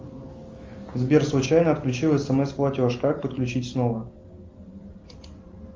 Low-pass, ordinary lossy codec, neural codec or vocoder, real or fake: 7.2 kHz; Opus, 32 kbps; none; real